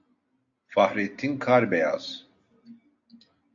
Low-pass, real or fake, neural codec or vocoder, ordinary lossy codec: 7.2 kHz; real; none; AAC, 48 kbps